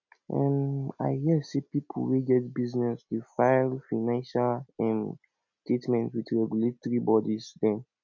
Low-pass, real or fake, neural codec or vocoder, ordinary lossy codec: 7.2 kHz; real; none; none